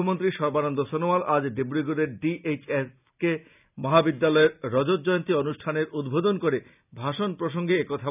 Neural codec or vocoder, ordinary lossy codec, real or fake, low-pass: none; none; real; 3.6 kHz